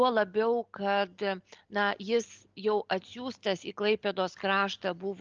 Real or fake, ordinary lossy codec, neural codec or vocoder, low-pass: real; Opus, 24 kbps; none; 7.2 kHz